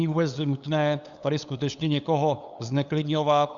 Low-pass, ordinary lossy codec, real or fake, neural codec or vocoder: 7.2 kHz; Opus, 64 kbps; fake; codec, 16 kHz, 8 kbps, FunCodec, trained on LibriTTS, 25 frames a second